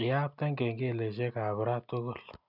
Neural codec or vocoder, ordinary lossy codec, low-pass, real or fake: none; none; 5.4 kHz; real